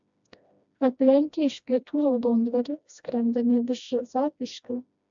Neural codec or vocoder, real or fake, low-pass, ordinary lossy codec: codec, 16 kHz, 1 kbps, FreqCodec, smaller model; fake; 7.2 kHz; MP3, 64 kbps